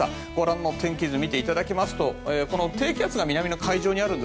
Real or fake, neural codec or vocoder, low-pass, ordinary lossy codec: real; none; none; none